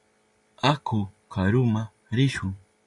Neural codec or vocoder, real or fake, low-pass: none; real; 10.8 kHz